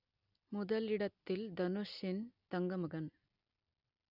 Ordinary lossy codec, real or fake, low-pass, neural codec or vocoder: none; real; 5.4 kHz; none